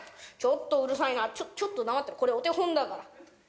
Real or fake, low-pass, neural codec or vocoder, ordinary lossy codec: real; none; none; none